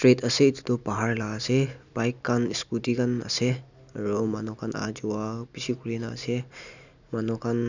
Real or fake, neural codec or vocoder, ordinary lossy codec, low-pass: real; none; none; 7.2 kHz